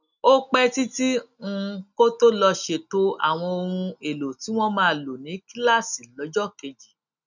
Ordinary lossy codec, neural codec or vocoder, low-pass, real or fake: none; none; 7.2 kHz; real